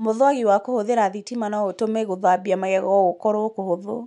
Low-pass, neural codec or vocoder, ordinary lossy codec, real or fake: 10.8 kHz; none; none; real